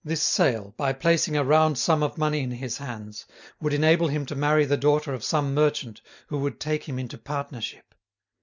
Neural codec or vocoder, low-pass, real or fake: none; 7.2 kHz; real